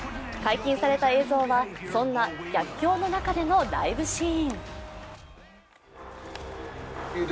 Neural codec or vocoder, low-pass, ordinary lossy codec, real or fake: none; none; none; real